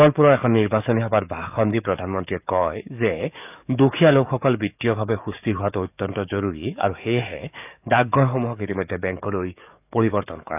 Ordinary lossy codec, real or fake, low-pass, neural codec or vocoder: none; fake; 3.6 kHz; codec, 44.1 kHz, 7.8 kbps, DAC